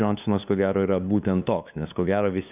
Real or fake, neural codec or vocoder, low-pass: fake; codec, 16 kHz, 2 kbps, FunCodec, trained on LibriTTS, 25 frames a second; 3.6 kHz